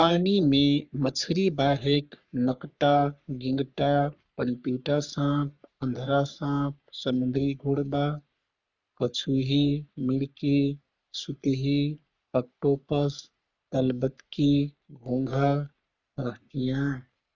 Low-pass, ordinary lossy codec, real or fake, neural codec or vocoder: 7.2 kHz; Opus, 64 kbps; fake; codec, 44.1 kHz, 3.4 kbps, Pupu-Codec